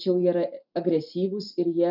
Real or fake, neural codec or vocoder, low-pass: real; none; 5.4 kHz